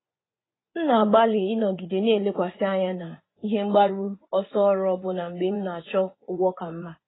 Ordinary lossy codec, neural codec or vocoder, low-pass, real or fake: AAC, 16 kbps; vocoder, 24 kHz, 100 mel bands, Vocos; 7.2 kHz; fake